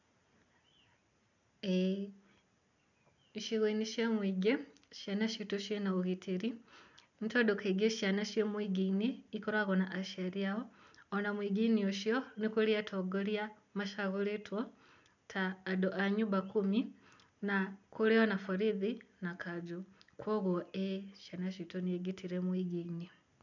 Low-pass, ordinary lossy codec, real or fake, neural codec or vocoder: 7.2 kHz; none; real; none